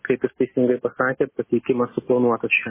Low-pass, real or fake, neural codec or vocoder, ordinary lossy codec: 3.6 kHz; real; none; MP3, 16 kbps